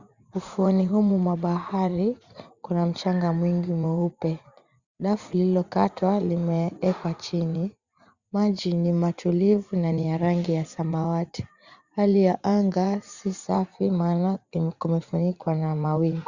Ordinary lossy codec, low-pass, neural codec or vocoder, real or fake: Opus, 64 kbps; 7.2 kHz; vocoder, 44.1 kHz, 80 mel bands, Vocos; fake